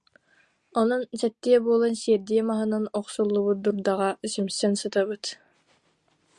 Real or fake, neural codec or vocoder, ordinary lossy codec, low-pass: real; none; Opus, 64 kbps; 10.8 kHz